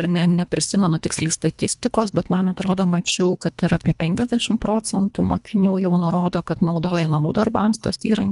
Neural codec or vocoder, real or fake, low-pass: codec, 24 kHz, 1.5 kbps, HILCodec; fake; 10.8 kHz